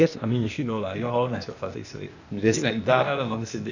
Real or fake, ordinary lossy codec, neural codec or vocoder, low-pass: fake; none; codec, 16 kHz, 0.8 kbps, ZipCodec; 7.2 kHz